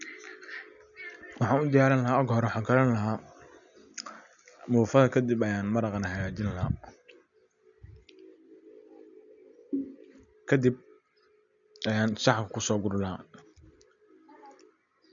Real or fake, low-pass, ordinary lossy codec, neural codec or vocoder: real; 7.2 kHz; none; none